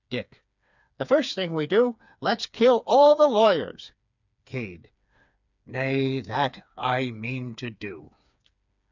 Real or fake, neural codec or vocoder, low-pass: fake; codec, 16 kHz, 4 kbps, FreqCodec, smaller model; 7.2 kHz